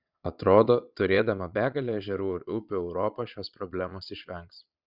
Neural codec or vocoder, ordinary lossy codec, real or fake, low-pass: vocoder, 22.05 kHz, 80 mel bands, Vocos; Opus, 64 kbps; fake; 5.4 kHz